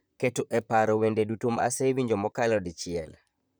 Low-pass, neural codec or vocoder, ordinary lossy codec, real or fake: none; vocoder, 44.1 kHz, 128 mel bands, Pupu-Vocoder; none; fake